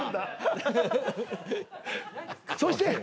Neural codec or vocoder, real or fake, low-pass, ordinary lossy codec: none; real; none; none